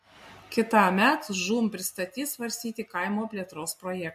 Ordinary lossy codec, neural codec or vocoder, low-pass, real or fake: MP3, 64 kbps; none; 14.4 kHz; real